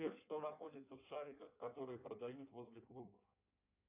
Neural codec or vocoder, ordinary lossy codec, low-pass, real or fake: codec, 16 kHz in and 24 kHz out, 1.1 kbps, FireRedTTS-2 codec; AAC, 32 kbps; 3.6 kHz; fake